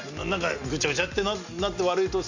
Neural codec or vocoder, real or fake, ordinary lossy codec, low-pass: none; real; Opus, 64 kbps; 7.2 kHz